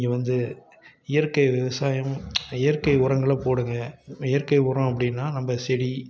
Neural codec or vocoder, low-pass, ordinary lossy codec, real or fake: none; none; none; real